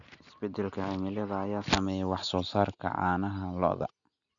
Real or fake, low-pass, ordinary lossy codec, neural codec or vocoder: real; 7.2 kHz; none; none